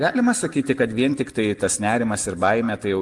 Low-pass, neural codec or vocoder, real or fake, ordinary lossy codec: 10.8 kHz; none; real; Opus, 24 kbps